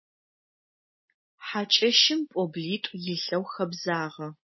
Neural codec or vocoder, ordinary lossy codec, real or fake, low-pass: none; MP3, 24 kbps; real; 7.2 kHz